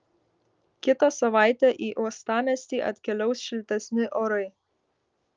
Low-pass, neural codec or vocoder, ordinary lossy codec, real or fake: 7.2 kHz; none; Opus, 24 kbps; real